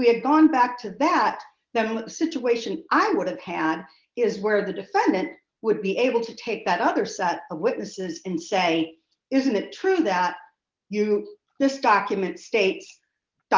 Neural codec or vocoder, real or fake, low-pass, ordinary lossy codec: none; real; 7.2 kHz; Opus, 32 kbps